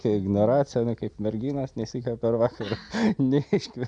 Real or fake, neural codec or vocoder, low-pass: real; none; 9.9 kHz